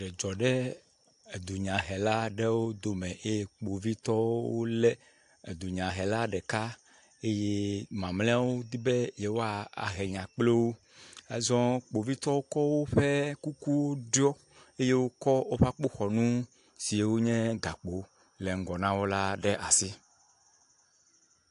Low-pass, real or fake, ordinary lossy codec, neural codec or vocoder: 10.8 kHz; real; AAC, 64 kbps; none